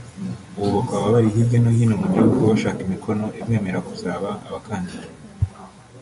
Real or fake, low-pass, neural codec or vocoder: fake; 10.8 kHz; vocoder, 24 kHz, 100 mel bands, Vocos